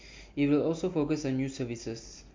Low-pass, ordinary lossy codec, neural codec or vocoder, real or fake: 7.2 kHz; MP3, 48 kbps; none; real